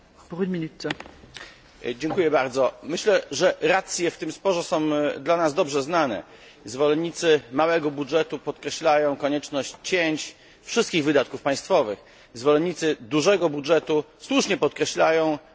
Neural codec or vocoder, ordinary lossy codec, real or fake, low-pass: none; none; real; none